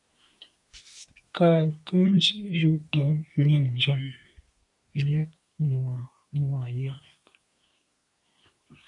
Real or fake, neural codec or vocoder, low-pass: fake; codec, 24 kHz, 1 kbps, SNAC; 10.8 kHz